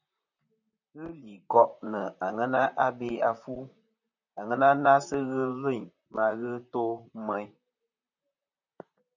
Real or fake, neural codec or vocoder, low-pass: fake; vocoder, 44.1 kHz, 128 mel bands, Pupu-Vocoder; 7.2 kHz